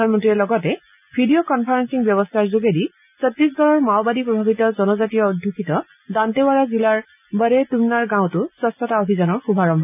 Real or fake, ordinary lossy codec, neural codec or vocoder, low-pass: real; none; none; 3.6 kHz